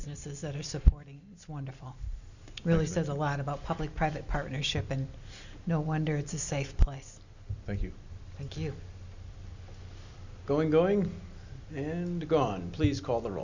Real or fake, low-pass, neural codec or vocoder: real; 7.2 kHz; none